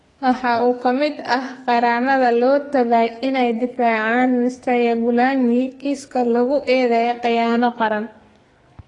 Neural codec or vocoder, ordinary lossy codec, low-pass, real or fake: codec, 44.1 kHz, 2.6 kbps, SNAC; AAC, 32 kbps; 10.8 kHz; fake